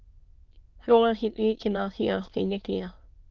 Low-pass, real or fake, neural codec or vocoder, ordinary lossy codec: 7.2 kHz; fake; autoencoder, 22.05 kHz, a latent of 192 numbers a frame, VITS, trained on many speakers; Opus, 32 kbps